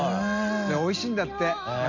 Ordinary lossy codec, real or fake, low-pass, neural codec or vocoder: none; real; 7.2 kHz; none